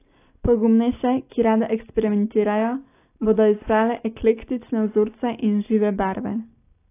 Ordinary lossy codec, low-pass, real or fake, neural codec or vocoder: AAC, 24 kbps; 3.6 kHz; real; none